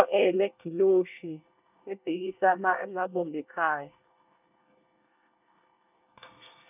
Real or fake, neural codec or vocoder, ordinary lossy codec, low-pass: fake; codec, 24 kHz, 1 kbps, SNAC; none; 3.6 kHz